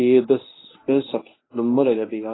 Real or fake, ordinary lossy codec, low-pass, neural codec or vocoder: fake; AAC, 16 kbps; 7.2 kHz; codec, 24 kHz, 0.9 kbps, WavTokenizer, medium speech release version 1